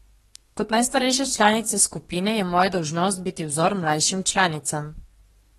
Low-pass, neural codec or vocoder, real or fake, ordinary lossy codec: 14.4 kHz; codec, 32 kHz, 1.9 kbps, SNAC; fake; AAC, 32 kbps